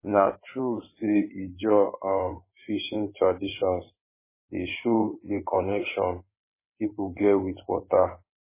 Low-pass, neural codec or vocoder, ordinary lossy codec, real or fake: 3.6 kHz; codec, 16 kHz in and 24 kHz out, 2.2 kbps, FireRedTTS-2 codec; MP3, 16 kbps; fake